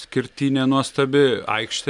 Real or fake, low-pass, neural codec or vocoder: real; 10.8 kHz; none